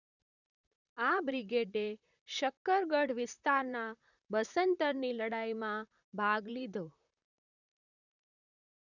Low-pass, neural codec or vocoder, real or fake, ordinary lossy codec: 7.2 kHz; vocoder, 44.1 kHz, 128 mel bands, Pupu-Vocoder; fake; none